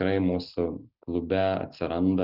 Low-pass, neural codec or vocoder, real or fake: 5.4 kHz; none; real